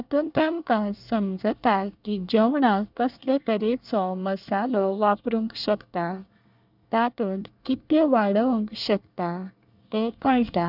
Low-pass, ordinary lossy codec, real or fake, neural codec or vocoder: 5.4 kHz; none; fake; codec, 24 kHz, 1 kbps, SNAC